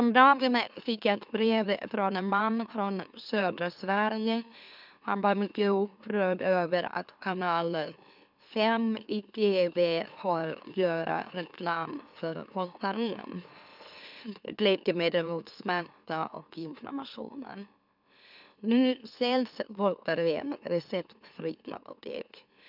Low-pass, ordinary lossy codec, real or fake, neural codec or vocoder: 5.4 kHz; none; fake; autoencoder, 44.1 kHz, a latent of 192 numbers a frame, MeloTTS